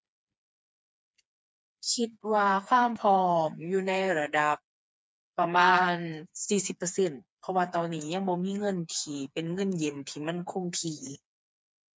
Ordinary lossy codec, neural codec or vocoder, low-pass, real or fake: none; codec, 16 kHz, 4 kbps, FreqCodec, smaller model; none; fake